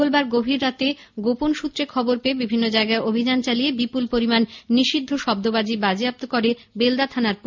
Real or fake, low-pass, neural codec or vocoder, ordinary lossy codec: real; 7.2 kHz; none; MP3, 48 kbps